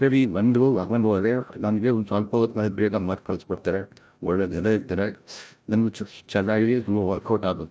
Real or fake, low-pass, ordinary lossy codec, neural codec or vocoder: fake; none; none; codec, 16 kHz, 0.5 kbps, FreqCodec, larger model